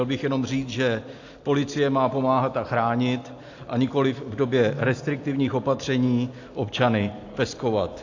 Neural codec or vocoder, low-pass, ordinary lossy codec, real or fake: none; 7.2 kHz; AAC, 48 kbps; real